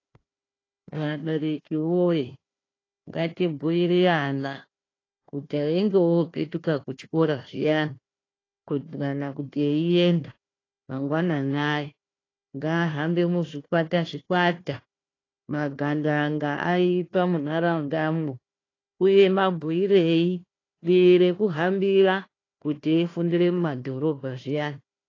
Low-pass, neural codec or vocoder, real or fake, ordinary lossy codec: 7.2 kHz; codec, 16 kHz, 1 kbps, FunCodec, trained on Chinese and English, 50 frames a second; fake; AAC, 32 kbps